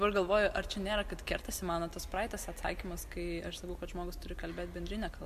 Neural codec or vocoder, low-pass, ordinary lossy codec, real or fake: none; 14.4 kHz; MP3, 64 kbps; real